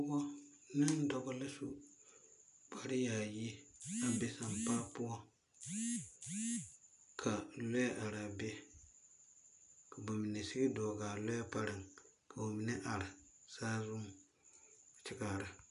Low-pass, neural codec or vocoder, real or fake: 10.8 kHz; none; real